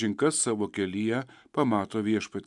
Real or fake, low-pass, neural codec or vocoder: real; 10.8 kHz; none